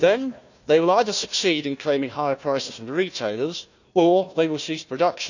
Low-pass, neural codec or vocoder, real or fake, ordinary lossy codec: 7.2 kHz; codec, 16 kHz, 1 kbps, FunCodec, trained on Chinese and English, 50 frames a second; fake; none